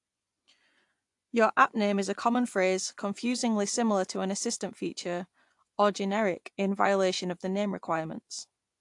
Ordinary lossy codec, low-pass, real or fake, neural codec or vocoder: AAC, 64 kbps; 10.8 kHz; fake; vocoder, 24 kHz, 100 mel bands, Vocos